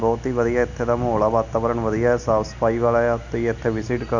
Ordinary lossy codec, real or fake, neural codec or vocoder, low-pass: none; real; none; 7.2 kHz